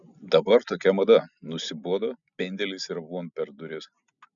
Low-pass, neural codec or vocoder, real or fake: 7.2 kHz; none; real